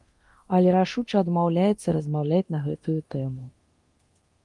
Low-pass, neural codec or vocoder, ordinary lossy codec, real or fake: 10.8 kHz; codec, 24 kHz, 0.9 kbps, DualCodec; Opus, 24 kbps; fake